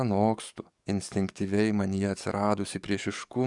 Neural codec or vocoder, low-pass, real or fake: codec, 44.1 kHz, 7.8 kbps, DAC; 10.8 kHz; fake